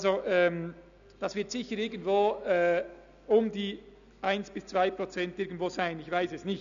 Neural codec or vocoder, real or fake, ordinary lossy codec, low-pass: none; real; none; 7.2 kHz